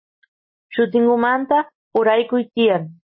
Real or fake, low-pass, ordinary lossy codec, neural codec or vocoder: real; 7.2 kHz; MP3, 24 kbps; none